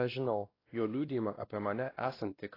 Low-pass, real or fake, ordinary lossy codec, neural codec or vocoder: 5.4 kHz; fake; AAC, 24 kbps; codec, 16 kHz, 1 kbps, X-Codec, WavLM features, trained on Multilingual LibriSpeech